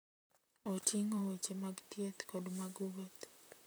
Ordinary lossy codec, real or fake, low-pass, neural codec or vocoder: none; fake; none; vocoder, 44.1 kHz, 128 mel bands, Pupu-Vocoder